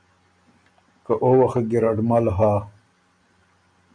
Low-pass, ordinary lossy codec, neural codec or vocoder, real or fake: 9.9 kHz; MP3, 96 kbps; none; real